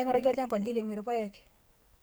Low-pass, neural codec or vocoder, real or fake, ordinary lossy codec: none; codec, 44.1 kHz, 2.6 kbps, SNAC; fake; none